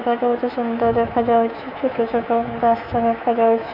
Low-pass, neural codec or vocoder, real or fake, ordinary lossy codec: 5.4 kHz; codec, 24 kHz, 3.1 kbps, DualCodec; fake; none